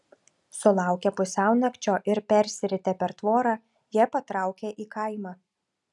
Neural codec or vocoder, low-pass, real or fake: none; 10.8 kHz; real